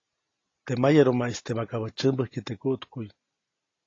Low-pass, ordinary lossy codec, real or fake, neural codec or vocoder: 7.2 kHz; AAC, 32 kbps; real; none